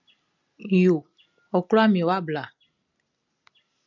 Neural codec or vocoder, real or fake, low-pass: none; real; 7.2 kHz